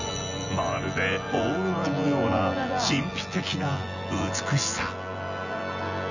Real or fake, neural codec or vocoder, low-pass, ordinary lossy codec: fake; vocoder, 24 kHz, 100 mel bands, Vocos; 7.2 kHz; none